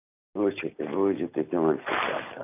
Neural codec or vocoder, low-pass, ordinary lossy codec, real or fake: none; 3.6 kHz; none; real